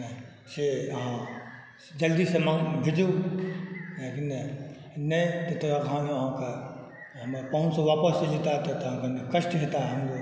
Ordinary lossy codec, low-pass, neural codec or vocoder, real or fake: none; none; none; real